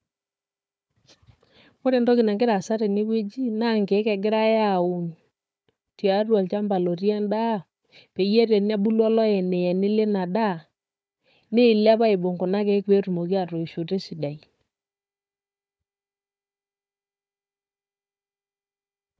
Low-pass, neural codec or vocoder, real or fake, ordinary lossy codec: none; codec, 16 kHz, 4 kbps, FunCodec, trained on Chinese and English, 50 frames a second; fake; none